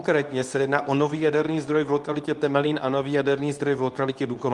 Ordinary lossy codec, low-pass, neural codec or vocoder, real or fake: Opus, 32 kbps; 10.8 kHz; codec, 24 kHz, 0.9 kbps, WavTokenizer, medium speech release version 2; fake